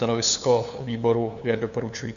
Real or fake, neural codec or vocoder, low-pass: fake; codec, 16 kHz, 2 kbps, FunCodec, trained on LibriTTS, 25 frames a second; 7.2 kHz